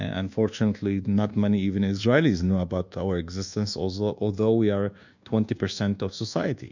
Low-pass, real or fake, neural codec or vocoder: 7.2 kHz; fake; codec, 24 kHz, 1.2 kbps, DualCodec